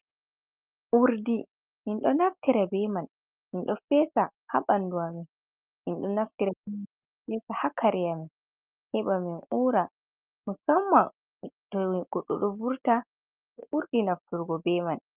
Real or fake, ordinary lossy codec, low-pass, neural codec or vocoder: real; Opus, 32 kbps; 3.6 kHz; none